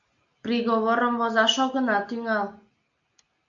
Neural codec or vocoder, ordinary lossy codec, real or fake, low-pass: none; AAC, 64 kbps; real; 7.2 kHz